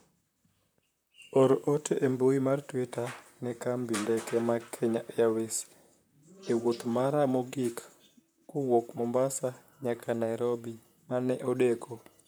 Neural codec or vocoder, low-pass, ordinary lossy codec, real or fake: vocoder, 44.1 kHz, 128 mel bands, Pupu-Vocoder; none; none; fake